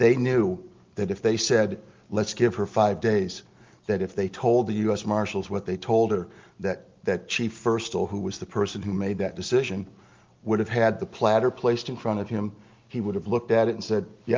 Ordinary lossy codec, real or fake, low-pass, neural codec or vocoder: Opus, 32 kbps; real; 7.2 kHz; none